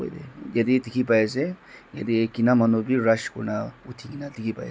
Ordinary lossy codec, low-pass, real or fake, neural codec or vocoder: none; none; real; none